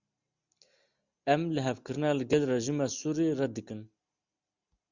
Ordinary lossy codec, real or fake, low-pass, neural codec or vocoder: Opus, 64 kbps; real; 7.2 kHz; none